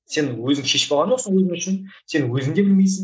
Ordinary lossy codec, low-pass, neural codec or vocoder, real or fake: none; none; none; real